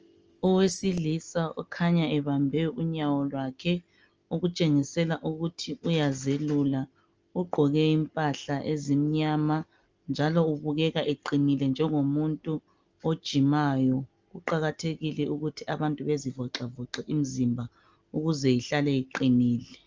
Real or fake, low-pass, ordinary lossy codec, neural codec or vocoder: real; 7.2 kHz; Opus, 24 kbps; none